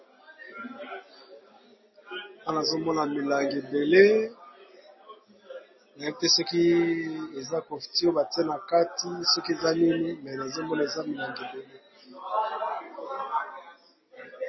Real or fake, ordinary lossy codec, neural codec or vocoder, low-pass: real; MP3, 24 kbps; none; 7.2 kHz